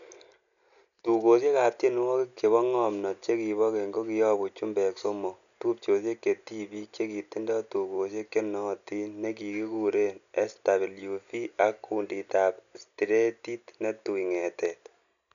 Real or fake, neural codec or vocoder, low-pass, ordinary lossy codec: real; none; 7.2 kHz; none